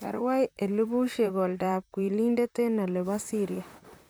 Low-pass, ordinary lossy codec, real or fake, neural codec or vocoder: none; none; fake; vocoder, 44.1 kHz, 128 mel bands, Pupu-Vocoder